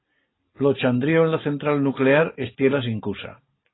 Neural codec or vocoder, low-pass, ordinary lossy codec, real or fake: none; 7.2 kHz; AAC, 16 kbps; real